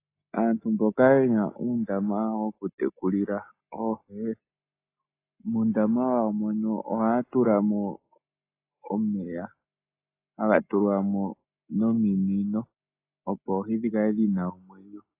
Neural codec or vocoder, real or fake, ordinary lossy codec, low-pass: none; real; AAC, 24 kbps; 3.6 kHz